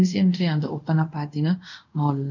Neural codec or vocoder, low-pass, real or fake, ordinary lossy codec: codec, 24 kHz, 0.5 kbps, DualCodec; 7.2 kHz; fake; none